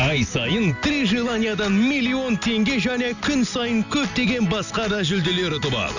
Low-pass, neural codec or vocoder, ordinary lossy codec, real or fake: 7.2 kHz; none; none; real